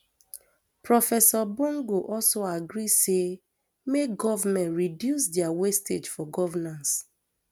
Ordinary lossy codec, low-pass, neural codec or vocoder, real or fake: none; none; none; real